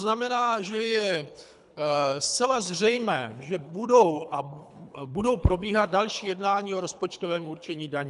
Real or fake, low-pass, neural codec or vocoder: fake; 10.8 kHz; codec, 24 kHz, 3 kbps, HILCodec